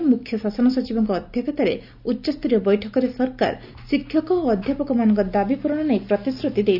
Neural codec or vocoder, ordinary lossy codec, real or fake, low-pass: none; none; real; 5.4 kHz